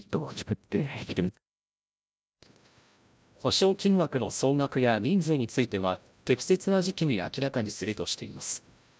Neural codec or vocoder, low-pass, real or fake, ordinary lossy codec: codec, 16 kHz, 0.5 kbps, FreqCodec, larger model; none; fake; none